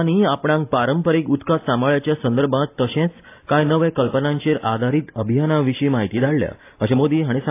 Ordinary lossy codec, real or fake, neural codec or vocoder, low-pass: AAC, 24 kbps; real; none; 3.6 kHz